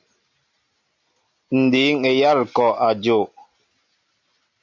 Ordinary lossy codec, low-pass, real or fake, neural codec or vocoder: MP3, 64 kbps; 7.2 kHz; real; none